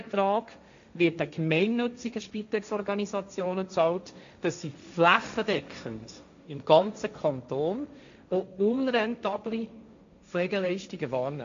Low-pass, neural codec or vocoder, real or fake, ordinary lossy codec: 7.2 kHz; codec, 16 kHz, 1.1 kbps, Voila-Tokenizer; fake; MP3, 64 kbps